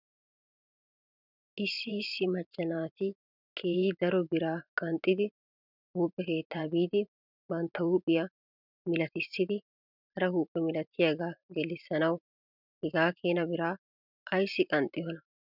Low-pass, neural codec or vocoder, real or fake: 5.4 kHz; vocoder, 44.1 kHz, 128 mel bands every 512 samples, BigVGAN v2; fake